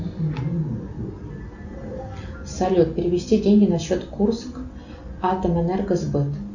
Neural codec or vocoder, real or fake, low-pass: none; real; 7.2 kHz